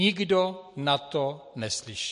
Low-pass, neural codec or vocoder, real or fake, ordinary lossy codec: 14.4 kHz; none; real; MP3, 48 kbps